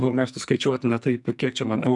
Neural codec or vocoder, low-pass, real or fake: codec, 44.1 kHz, 2.6 kbps, SNAC; 10.8 kHz; fake